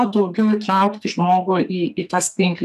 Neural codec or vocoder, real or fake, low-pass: codec, 44.1 kHz, 2.6 kbps, SNAC; fake; 14.4 kHz